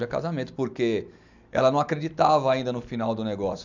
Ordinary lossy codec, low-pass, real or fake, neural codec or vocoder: none; 7.2 kHz; real; none